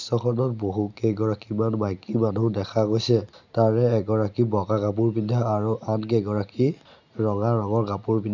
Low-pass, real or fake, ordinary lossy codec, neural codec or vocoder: 7.2 kHz; real; none; none